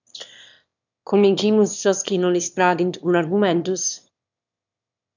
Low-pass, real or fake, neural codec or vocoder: 7.2 kHz; fake; autoencoder, 22.05 kHz, a latent of 192 numbers a frame, VITS, trained on one speaker